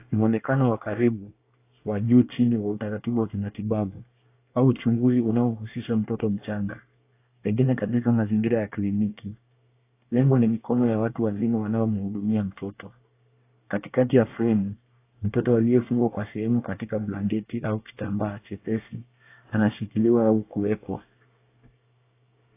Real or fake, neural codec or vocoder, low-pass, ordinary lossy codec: fake; codec, 24 kHz, 1 kbps, SNAC; 3.6 kHz; AAC, 24 kbps